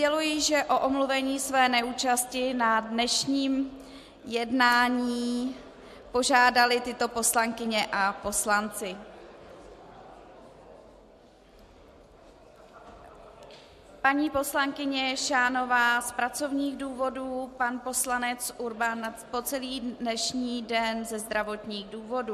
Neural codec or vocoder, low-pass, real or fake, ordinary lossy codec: none; 14.4 kHz; real; MP3, 64 kbps